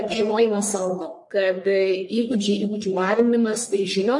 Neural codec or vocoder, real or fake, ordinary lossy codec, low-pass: codec, 44.1 kHz, 1.7 kbps, Pupu-Codec; fake; MP3, 48 kbps; 10.8 kHz